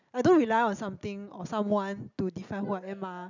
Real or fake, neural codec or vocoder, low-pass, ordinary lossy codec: real; none; 7.2 kHz; none